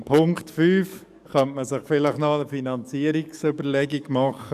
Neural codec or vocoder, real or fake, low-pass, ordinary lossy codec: codec, 44.1 kHz, 7.8 kbps, DAC; fake; 14.4 kHz; none